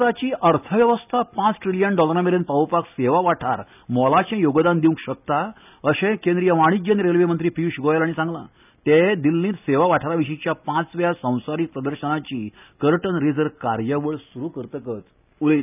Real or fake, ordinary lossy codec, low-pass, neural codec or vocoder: real; none; 3.6 kHz; none